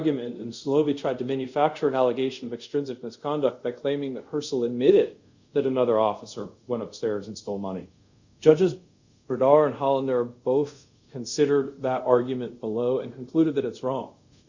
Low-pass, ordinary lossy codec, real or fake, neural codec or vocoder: 7.2 kHz; Opus, 64 kbps; fake; codec, 24 kHz, 0.5 kbps, DualCodec